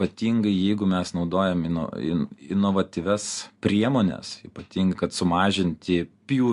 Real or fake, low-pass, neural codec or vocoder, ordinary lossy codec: real; 10.8 kHz; none; MP3, 64 kbps